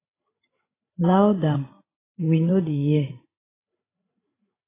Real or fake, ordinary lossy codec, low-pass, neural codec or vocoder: fake; AAC, 16 kbps; 3.6 kHz; codec, 16 kHz, 8 kbps, FreqCodec, larger model